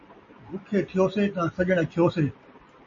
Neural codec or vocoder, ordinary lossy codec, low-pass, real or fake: none; MP3, 32 kbps; 7.2 kHz; real